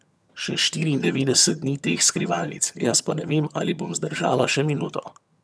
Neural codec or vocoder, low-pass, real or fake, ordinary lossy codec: vocoder, 22.05 kHz, 80 mel bands, HiFi-GAN; none; fake; none